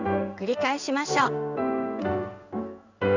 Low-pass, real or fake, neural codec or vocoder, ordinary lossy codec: 7.2 kHz; fake; codec, 16 kHz in and 24 kHz out, 1 kbps, XY-Tokenizer; none